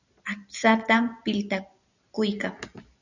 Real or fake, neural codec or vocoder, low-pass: real; none; 7.2 kHz